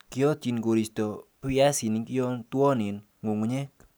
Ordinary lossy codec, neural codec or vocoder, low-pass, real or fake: none; none; none; real